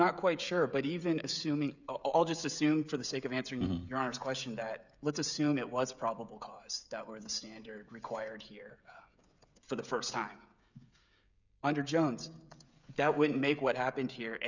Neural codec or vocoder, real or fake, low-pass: codec, 16 kHz, 16 kbps, FreqCodec, smaller model; fake; 7.2 kHz